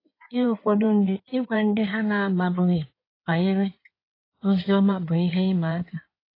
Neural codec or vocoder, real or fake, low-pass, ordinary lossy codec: codec, 16 kHz in and 24 kHz out, 2.2 kbps, FireRedTTS-2 codec; fake; 5.4 kHz; AAC, 24 kbps